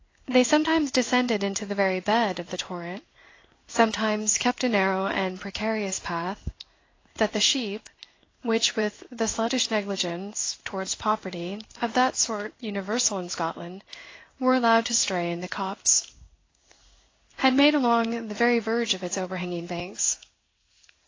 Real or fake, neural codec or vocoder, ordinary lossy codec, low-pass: fake; codec, 16 kHz in and 24 kHz out, 1 kbps, XY-Tokenizer; AAC, 32 kbps; 7.2 kHz